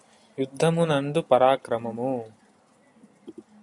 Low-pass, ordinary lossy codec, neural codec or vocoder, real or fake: 10.8 kHz; AAC, 64 kbps; none; real